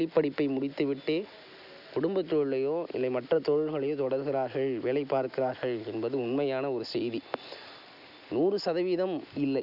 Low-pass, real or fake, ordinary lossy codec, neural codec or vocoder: 5.4 kHz; real; none; none